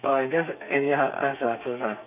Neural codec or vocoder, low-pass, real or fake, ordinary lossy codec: codec, 32 kHz, 1.9 kbps, SNAC; 3.6 kHz; fake; none